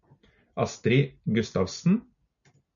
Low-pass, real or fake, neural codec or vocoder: 7.2 kHz; real; none